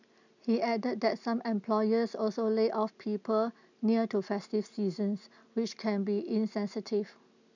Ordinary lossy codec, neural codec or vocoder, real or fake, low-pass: none; none; real; 7.2 kHz